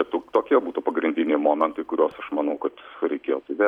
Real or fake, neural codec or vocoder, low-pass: real; none; 19.8 kHz